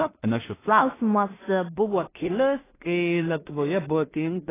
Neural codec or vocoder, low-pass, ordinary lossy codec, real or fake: codec, 16 kHz in and 24 kHz out, 0.4 kbps, LongCat-Audio-Codec, two codebook decoder; 3.6 kHz; AAC, 16 kbps; fake